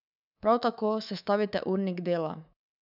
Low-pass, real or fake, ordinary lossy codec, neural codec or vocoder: 5.4 kHz; fake; none; codec, 24 kHz, 3.1 kbps, DualCodec